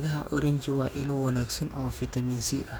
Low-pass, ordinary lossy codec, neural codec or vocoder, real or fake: none; none; codec, 44.1 kHz, 2.6 kbps, DAC; fake